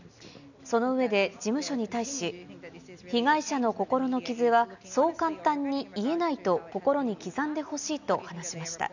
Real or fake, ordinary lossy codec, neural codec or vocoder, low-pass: real; none; none; 7.2 kHz